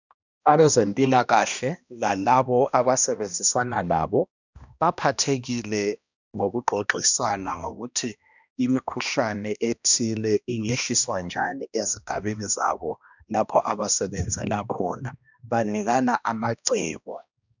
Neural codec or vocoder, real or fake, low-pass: codec, 16 kHz, 1 kbps, X-Codec, HuBERT features, trained on balanced general audio; fake; 7.2 kHz